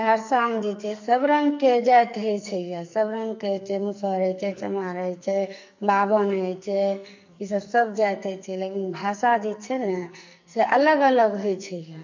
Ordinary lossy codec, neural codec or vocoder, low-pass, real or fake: MP3, 48 kbps; codec, 44.1 kHz, 2.6 kbps, SNAC; 7.2 kHz; fake